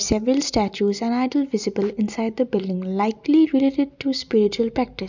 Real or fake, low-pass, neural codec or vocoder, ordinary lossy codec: real; 7.2 kHz; none; none